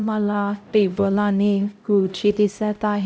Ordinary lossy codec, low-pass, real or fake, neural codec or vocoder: none; none; fake; codec, 16 kHz, 0.5 kbps, X-Codec, HuBERT features, trained on LibriSpeech